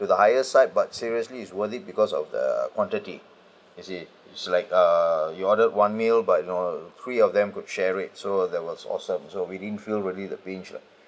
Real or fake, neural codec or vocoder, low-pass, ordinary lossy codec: real; none; none; none